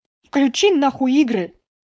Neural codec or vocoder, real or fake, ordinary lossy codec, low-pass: codec, 16 kHz, 4.8 kbps, FACodec; fake; none; none